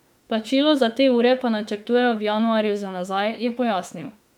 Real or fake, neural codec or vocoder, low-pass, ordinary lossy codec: fake; autoencoder, 48 kHz, 32 numbers a frame, DAC-VAE, trained on Japanese speech; 19.8 kHz; none